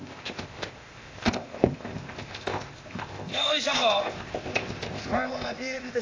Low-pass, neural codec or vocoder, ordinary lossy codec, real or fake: 7.2 kHz; codec, 16 kHz, 0.8 kbps, ZipCodec; AAC, 32 kbps; fake